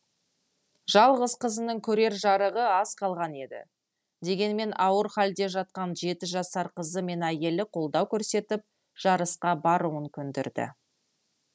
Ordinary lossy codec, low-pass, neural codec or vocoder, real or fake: none; none; none; real